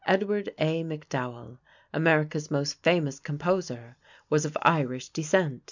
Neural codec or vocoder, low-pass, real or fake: none; 7.2 kHz; real